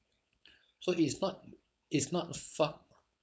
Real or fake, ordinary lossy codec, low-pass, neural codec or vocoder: fake; none; none; codec, 16 kHz, 4.8 kbps, FACodec